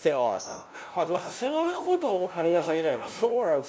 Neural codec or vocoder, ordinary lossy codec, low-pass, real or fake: codec, 16 kHz, 0.5 kbps, FunCodec, trained on LibriTTS, 25 frames a second; none; none; fake